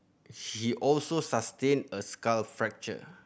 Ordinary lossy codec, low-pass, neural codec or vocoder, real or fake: none; none; none; real